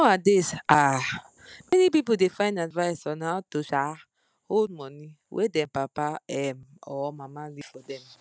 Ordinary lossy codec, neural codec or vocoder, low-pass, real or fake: none; none; none; real